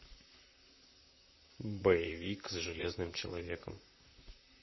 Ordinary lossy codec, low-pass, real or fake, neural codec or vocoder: MP3, 24 kbps; 7.2 kHz; fake; vocoder, 44.1 kHz, 128 mel bands, Pupu-Vocoder